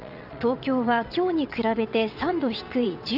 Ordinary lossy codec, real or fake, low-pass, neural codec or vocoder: none; fake; 5.4 kHz; vocoder, 22.05 kHz, 80 mel bands, WaveNeXt